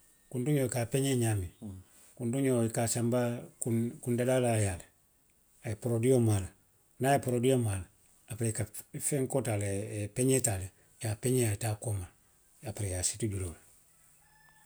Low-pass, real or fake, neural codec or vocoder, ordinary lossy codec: none; real; none; none